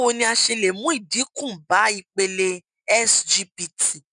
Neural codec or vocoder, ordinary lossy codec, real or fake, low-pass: none; none; real; 9.9 kHz